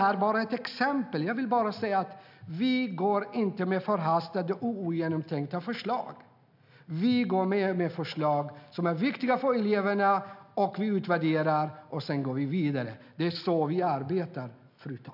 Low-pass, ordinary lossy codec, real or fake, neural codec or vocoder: 5.4 kHz; none; real; none